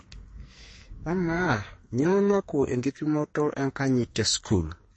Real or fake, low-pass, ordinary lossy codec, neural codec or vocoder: fake; 9.9 kHz; MP3, 32 kbps; codec, 44.1 kHz, 2.6 kbps, SNAC